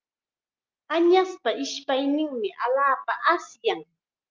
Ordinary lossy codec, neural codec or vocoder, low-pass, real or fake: Opus, 24 kbps; none; 7.2 kHz; real